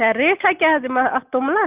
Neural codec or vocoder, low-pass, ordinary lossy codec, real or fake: none; 3.6 kHz; Opus, 32 kbps; real